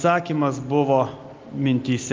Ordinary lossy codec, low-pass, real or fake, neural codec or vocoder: Opus, 32 kbps; 7.2 kHz; real; none